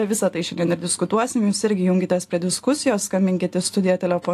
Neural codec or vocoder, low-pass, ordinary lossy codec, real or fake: none; 14.4 kHz; AAC, 64 kbps; real